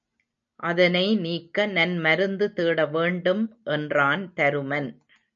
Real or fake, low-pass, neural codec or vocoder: real; 7.2 kHz; none